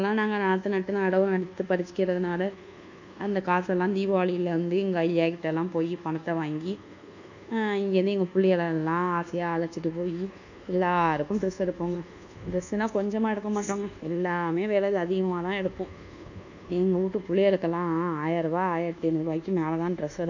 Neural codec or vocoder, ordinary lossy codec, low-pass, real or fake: codec, 24 kHz, 1.2 kbps, DualCodec; none; 7.2 kHz; fake